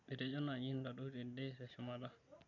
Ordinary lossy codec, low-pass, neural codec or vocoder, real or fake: none; 7.2 kHz; none; real